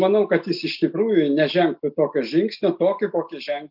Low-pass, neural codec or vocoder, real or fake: 5.4 kHz; none; real